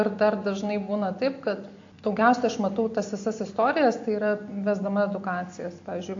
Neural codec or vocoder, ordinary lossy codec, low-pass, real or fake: none; AAC, 48 kbps; 7.2 kHz; real